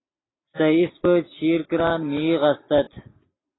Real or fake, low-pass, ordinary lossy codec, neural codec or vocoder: real; 7.2 kHz; AAC, 16 kbps; none